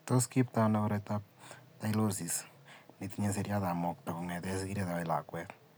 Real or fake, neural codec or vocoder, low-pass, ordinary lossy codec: real; none; none; none